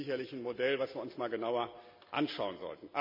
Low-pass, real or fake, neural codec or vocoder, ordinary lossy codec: 5.4 kHz; real; none; MP3, 48 kbps